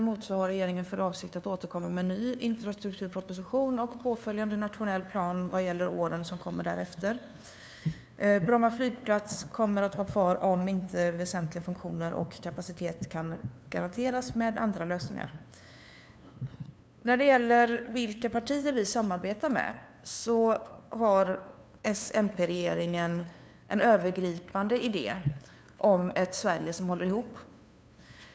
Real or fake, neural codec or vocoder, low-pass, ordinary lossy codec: fake; codec, 16 kHz, 2 kbps, FunCodec, trained on LibriTTS, 25 frames a second; none; none